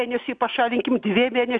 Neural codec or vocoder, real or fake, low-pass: none; real; 9.9 kHz